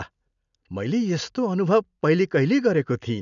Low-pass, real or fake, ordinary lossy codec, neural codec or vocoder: 7.2 kHz; real; none; none